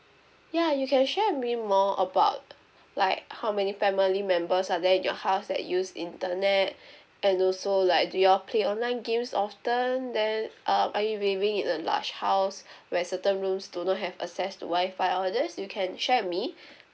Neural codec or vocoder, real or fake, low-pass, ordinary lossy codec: none; real; none; none